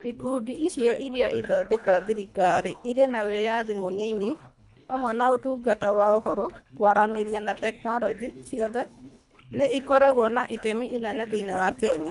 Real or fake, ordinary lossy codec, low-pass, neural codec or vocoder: fake; none; 10.8 kHz; codec, 24 kHz, 1.5 kbps, HILCodec